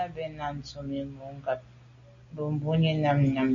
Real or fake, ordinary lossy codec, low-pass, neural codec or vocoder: real; MP3, 64 kbps; 7.2 kHz; none